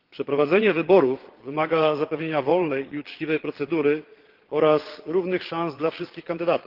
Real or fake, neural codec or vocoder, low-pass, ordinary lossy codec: fake; vocoder, 22.05 kHz, 80 mel bands, Vocos; 5.4 kHz; Opus, 16 kbps